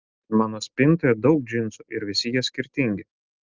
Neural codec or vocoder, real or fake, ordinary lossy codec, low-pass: none; real; Opus, 24 kbps; 7.2 kHz